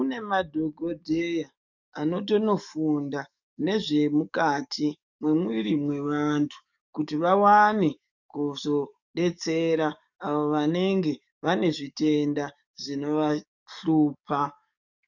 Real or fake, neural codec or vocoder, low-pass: fake; codec, 44.1 kHz, 7.8 kbps, DAC; 7.2 kHz